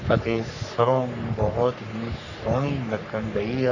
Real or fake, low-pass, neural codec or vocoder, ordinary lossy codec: fake; 7.2 kHz; codec, 44.1 kHz, 3.4 kbps, Pupu-Codec; none